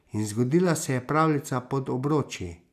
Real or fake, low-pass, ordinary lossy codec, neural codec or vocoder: real; 14.4 kHz; none; none